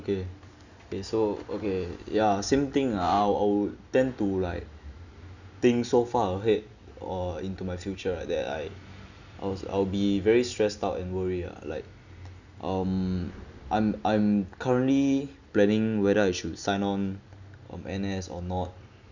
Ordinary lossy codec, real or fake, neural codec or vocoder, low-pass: none; real; none; 7.2 kHz